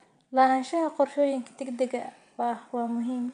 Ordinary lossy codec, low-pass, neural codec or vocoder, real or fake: none; 9.9 kHz; vocoder, 22.05 kHz, 80 mel bands, WaveNeXt; fake